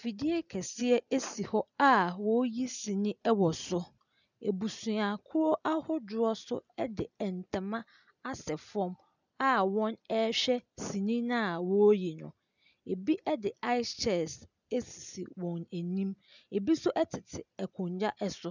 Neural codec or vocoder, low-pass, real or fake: none; 7.2 kHz; real